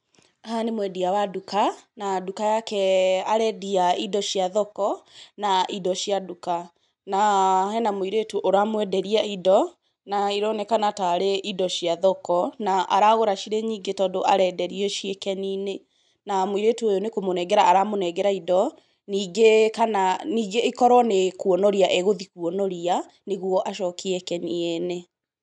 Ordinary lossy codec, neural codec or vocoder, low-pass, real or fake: none; none; 10.8 kHz; real